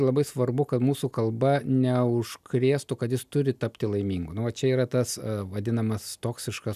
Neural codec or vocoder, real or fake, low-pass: none; real; 14.4 kHz